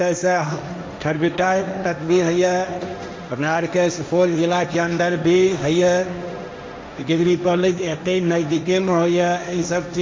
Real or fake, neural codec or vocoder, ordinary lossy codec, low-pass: fake; codec, 16 kHz, 1.1 kbps, Voila-Tokenizer; none; none